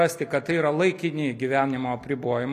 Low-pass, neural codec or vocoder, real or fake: 14.4 kHz; none; real